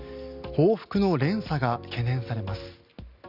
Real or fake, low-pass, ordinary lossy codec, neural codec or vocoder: real; 5.4 kHz; none; none